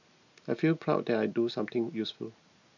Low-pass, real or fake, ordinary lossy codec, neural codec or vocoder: 7.2 kHz; real; none; none